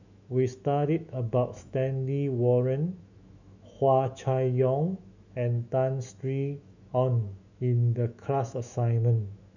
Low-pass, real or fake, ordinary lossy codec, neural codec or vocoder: 7.2 kHz; fake; none; autoencoder, 48 kHz, 128 numbers a frame, DAC-VAE, trained on Japanese speech